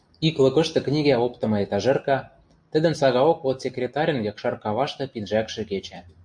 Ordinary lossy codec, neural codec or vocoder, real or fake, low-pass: MP3, 64 kbps; none; real; 9.9 kHz